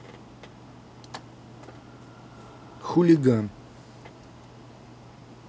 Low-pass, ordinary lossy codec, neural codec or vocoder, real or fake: none; none; none; real